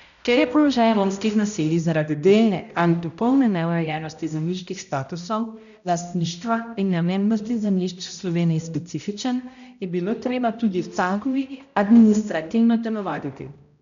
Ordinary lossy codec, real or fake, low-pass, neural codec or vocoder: none; fake; 7.2 kHz; codec, 16 kHz, 0.5 kbps, X-Codec, HuBERT features, trained on balanced general audio